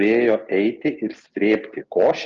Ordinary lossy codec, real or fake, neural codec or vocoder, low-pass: Opus, 24 kbps; real; none; 7.2 kHz